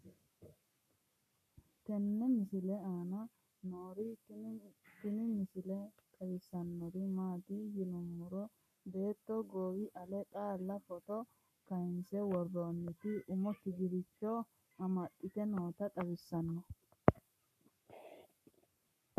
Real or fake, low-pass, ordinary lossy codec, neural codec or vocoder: fake; 14.4 kHz; AAC, 96 kbps; codec, 44.1 kHz, 7.8 kbps, Pupu-Codec